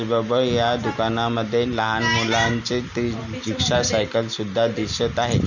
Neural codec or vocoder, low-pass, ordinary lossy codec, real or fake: none; 7.2 kHz; none; real